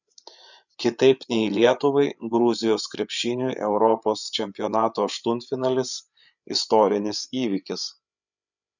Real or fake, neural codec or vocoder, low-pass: fake; codec, 16 kHz, 8 kbps, FreqCodec, larger model; 7.2 kHz